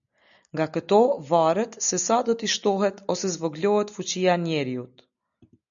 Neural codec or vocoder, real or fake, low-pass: none; real; 7.2 kHz